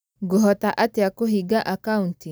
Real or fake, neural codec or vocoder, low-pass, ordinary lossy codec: real; none; none; none